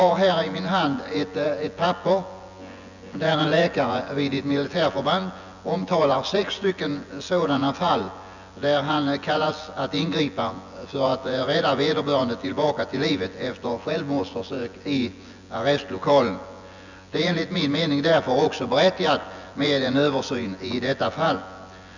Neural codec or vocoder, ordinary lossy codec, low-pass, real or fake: vocoder, 24 kHz, 100 mel bands, Vocos; none; 7.2 kHz; fake